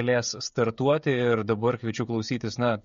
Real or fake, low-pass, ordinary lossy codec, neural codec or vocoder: fake; 7.2 kHz; MP3, 48 kbps; codec, 16 kHz, 16 kbps, FreqCodec, smaller model